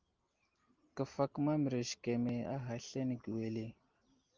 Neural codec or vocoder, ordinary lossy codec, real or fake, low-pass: none; Opus, 32 kbps; real; 7.2 kHz